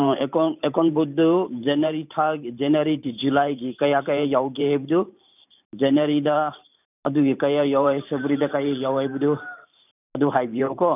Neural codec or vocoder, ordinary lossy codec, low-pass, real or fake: vocoder, 44.1 kHz, 128 mel bands every 512 samples, BigVGAN v2; none; 3.6 kHz; fake